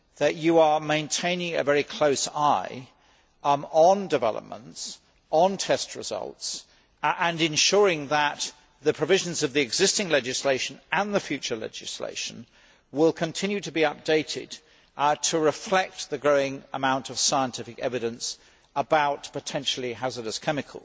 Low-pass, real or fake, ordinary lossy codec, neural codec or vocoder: none; real; none; none